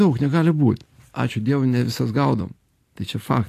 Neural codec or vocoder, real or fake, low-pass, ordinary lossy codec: none; real; 14.4 kHz; AAC, 64 kbps